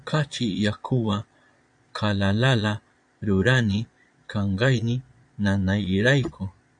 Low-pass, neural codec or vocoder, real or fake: 9.9 kHz; vocoder, 22.05 kHz, 80 mel bands, Vocos; fake